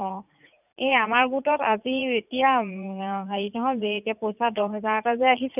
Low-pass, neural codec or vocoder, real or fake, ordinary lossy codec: 3.6 kHz; vocoder, 44.1 kHz, 80 mel bands, Vocos; fake; none